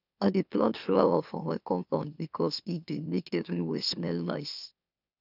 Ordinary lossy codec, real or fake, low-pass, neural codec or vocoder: none; fake; 5.4 kHz; autoencoder, 44.1 kHz, a latent of 192 numbers a frame, MeloTTS